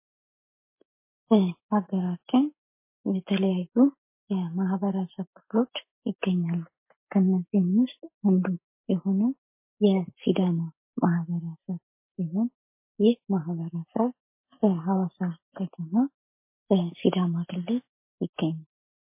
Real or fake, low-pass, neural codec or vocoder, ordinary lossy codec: real; 3.6 kHz; none; MP3, 24 kbps